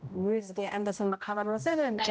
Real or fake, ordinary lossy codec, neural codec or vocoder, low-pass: fake; none; codec, 16 kHz, 0.5 kbps, X-Codec, HuBERT features, trained on general audio; none